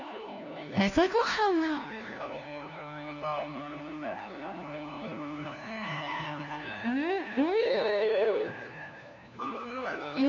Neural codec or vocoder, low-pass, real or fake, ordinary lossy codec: codec, 16 kHz, 1 kbps, FunCodec, trained on LibriTTS, 50 frames a second; 7.2 kHz; fake; none